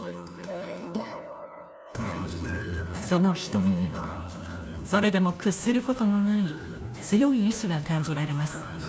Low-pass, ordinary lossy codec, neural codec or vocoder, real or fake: none; none; codec, 16 kHz, 1 kbps, FunCodec, trained on LibriTTS, 50 frames a second; fake